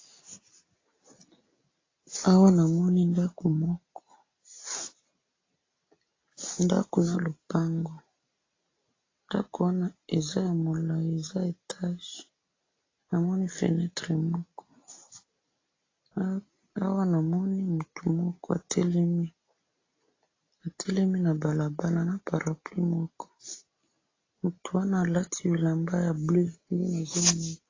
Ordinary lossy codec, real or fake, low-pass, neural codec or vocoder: AAC, 32 kbps; real; 7.2 kHz; none